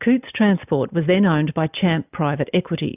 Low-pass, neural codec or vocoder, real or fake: 3.6 kHz; none; real